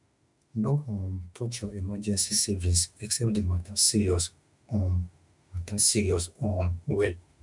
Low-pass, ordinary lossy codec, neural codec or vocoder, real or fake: 10.8 kHz; none; autoencoder, 48 kHz, 32 numbers a frame, DAC-VAE, trained on Japanese speech; fake